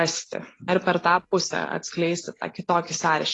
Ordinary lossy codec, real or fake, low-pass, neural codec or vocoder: AAC, 32 kbps; real; 10.8 kHz; none